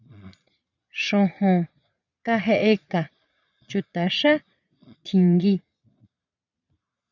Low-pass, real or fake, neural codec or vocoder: 7.2 kHz; fake; vocoder, 22.05 kHz, 80 mel bands, Vocos